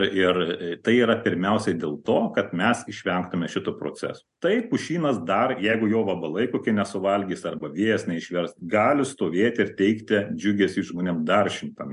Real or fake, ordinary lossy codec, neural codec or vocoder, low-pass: real; MP3, 64 kbps; none; 10.8 kHz